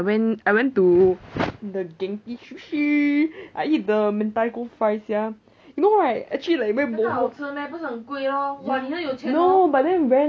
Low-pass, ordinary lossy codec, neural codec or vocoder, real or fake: none; none; none; real